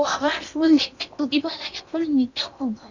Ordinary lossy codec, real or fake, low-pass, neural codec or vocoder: none; fake; 7.2 kHz; codec, 16 kHz in and 24 kHz out, 0.8 kbps, FocalCodec, streaming, 65536 codes